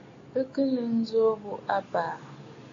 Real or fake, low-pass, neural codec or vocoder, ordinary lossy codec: real; 7.2 kHz; none; AAC, 32 kbps